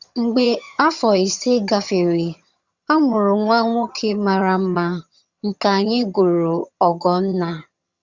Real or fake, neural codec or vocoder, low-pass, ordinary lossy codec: fake; vocoder, 22.05 kHz, 80 mel bands, HiFi-GAN; 7.2 kHz; Opus, 64 kbps